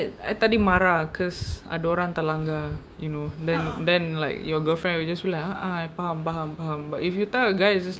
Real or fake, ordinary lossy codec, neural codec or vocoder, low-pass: fake; none; codec, 16 kHz, 6 kbps, DAC; none